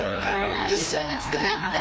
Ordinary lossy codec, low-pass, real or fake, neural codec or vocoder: none; none; fake; codec, 16 kHz, 1 kbps, FreqCodec, larger model